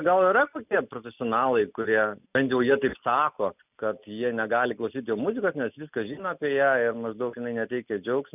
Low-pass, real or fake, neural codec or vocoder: 3.6 kHz; real; none